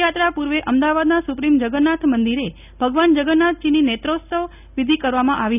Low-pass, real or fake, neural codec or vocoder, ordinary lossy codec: 3.6 kHz; real; none; none